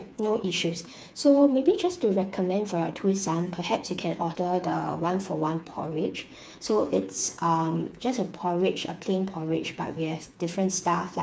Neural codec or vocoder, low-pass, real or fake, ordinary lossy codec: codec, 16 kHz, 4 kbps, FreqCodec, smaller model; none; fake; none